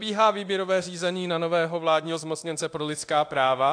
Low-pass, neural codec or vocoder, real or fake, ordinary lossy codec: 9.9 kHz; codec, 24 kHz, 0.9 kbps, DualCodec; fake; AAC, 64 kbps